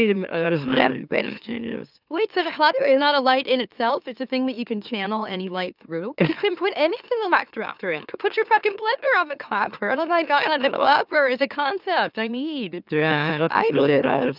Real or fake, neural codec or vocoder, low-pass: fake; autoencoder, 44.1 kHz, a latent of 192 numbers a frame, MeloTTS; 5.4 kHz